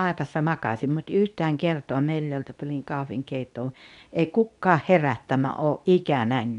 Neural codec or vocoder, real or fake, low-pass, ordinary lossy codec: codec, 24 kHz, 0.9 kbps, WavTokenizer, medium speech release version 2; fake; 10.8 kHz; none